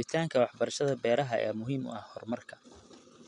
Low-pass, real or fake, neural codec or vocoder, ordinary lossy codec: 10.8 kHz; real; none; none